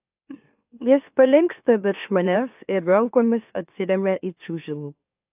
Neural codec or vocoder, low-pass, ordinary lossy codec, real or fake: autoencoder, 44.1 kHz, a latent of 192 numbers a frame, MeloTTS; 3.6 kHz; AAC, 32 kbps; fake